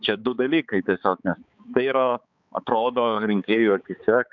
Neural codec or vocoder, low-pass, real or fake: codec, 16 kHz, 4 kbps, X-Codec, HuBERT features, trained on balanced general audio; 7.2 kHz; fake